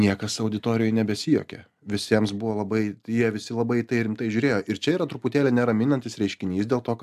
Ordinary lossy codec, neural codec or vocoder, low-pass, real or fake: AAC, 96 kbps; none; 14.4 kHz; real